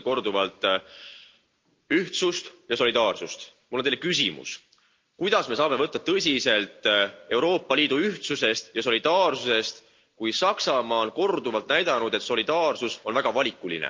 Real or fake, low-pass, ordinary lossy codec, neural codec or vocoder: real; 7.2 kHz; Opus, 24 kbps; none